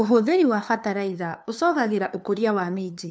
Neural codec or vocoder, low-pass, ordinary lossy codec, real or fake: codec, 16 kHz, 2 kbps, FunCodec, trained on LibriTTS, 25 frames a second; none; none; fake